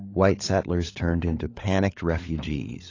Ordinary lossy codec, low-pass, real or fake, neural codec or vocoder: AAC, 32 kbps; 7.2 kHz; fake; codec, 16 kHz, 16 kbps, FunCodec, trained on LibriTTS, 50 frames a second